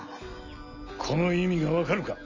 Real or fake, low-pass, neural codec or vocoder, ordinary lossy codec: real; 7.2 kHz; none; none